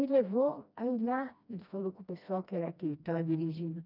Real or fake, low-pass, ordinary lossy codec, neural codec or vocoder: fake; 5.4 kHz; none; codec, 16 kHz, 1 kbps, FreqCodec, smaller model